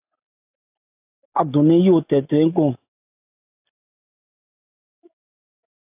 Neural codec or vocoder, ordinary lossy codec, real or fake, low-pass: none; AAC, 32 kbps; real; 3.6 kHz